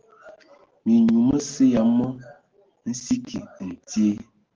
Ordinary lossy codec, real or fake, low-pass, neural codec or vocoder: Opus, 16 kbps; real; 7.2 kHz; none